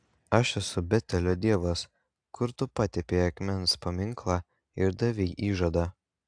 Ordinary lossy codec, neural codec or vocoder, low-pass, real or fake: AAC, 64 kbps; none; 9.9 kHz; real